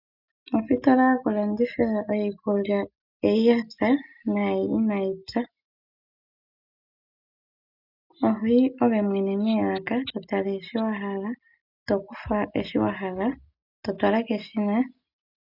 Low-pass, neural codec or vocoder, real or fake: 5.4 kHz; none; real